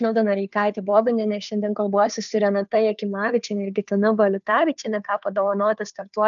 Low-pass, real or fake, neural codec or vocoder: 7.2 kHz; fake; codec, 16 kHz, 2 kbps, FunCodec, trained on Chinese and English, 25 frames a second